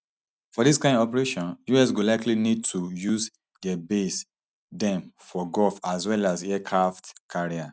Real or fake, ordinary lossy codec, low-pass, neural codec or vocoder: real; none; none; none